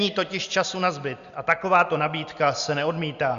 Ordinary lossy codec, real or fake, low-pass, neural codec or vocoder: Opus, 64 kbps; real; 7.2 kHz; none